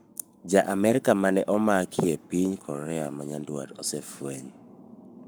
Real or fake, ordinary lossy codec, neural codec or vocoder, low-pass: fake; none; codec, 44.1 kHz, 7.8 kbps, Pupu-Codec; none